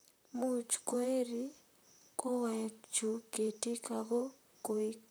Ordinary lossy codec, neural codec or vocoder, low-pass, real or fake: none; vocoder, 44.1 kHz, 128 mel bands, Pupu-Vocoder; none; fake